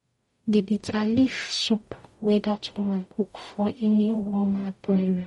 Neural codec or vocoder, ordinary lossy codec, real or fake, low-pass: codec, 44.1 kHz, 0.9 kbps, DAC; MP3, 48 kbps; fake; 19.8 kHz